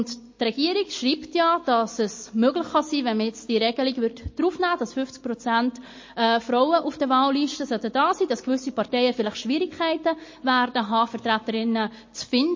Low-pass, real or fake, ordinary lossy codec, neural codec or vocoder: 7.2 kHz; real; MP3, 32 kbps; none